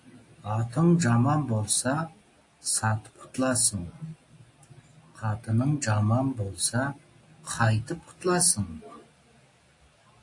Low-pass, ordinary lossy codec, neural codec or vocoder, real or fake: 10.8 kHz; AAC, 48 kbps; none; real